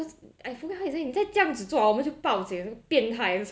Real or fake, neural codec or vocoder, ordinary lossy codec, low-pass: real; none; none; none